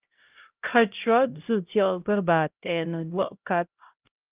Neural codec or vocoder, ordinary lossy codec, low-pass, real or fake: codec, 16 kHz, 0.5 kbps, X-Codec, HuBERT features, trained on LibriSpeech; Opus, 24 kbps; 3.6 kHz; fake